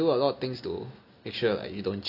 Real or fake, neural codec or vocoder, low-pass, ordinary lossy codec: real; none; 5.4 kHz; none